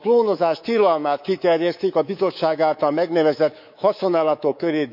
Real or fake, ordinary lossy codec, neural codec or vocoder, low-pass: fake; none; codec, 24 kHz, 3.1 kbps, DualCodec; 5.4 kHz